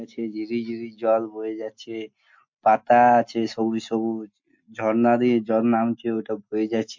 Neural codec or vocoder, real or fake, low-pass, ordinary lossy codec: none; real; 7.2 kHz; MP3, 48 kbps